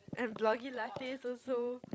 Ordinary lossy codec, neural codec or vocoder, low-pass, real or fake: none; codec, 16 kHz, 16 kbps, FreqCodec, larger model; none; fake